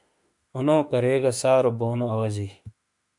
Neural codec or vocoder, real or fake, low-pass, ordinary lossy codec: autoencoder, 48 kHz, 32 numbers a frame, DAC-VAE, trained on Japanese speech; fake; 10.8 kHz; MP3, 96 kbps